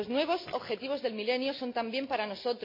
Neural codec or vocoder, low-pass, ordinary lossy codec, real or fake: none; 5.4 kHz; MP3, 32 kbps; real